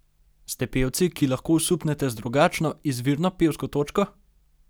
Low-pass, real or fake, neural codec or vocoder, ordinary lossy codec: none; real; none; none